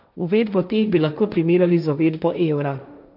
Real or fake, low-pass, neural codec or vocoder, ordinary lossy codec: fake; 5.4 kHz; codec, 16 kHz, 1.1 kbps, Voila-Tokenizer; none